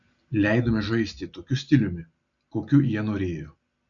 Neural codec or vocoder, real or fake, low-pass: none; real; 7.2 kHz